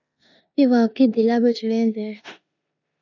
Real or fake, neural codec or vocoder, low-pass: fake; codec, 16 kHz in and 24 kHz out, 0.9 kbps, LongCat-Audio-Codec, four codebook decoder; 7.2 kHz